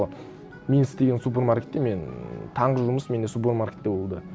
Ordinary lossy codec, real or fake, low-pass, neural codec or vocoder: none; real; none; none